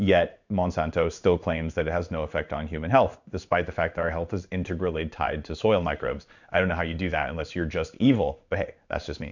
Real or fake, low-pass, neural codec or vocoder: fake; 7.2 kHz; codec, 16 kHz in and 24 kHz out, 1 kbps, XY-Tokenizer